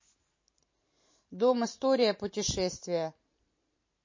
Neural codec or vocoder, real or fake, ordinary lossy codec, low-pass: vocoder, 44.1 kHz, 128 mel bands every 512 samples, BigVGAN v2; fake; MP3, 32 kbps; 7.2 kHz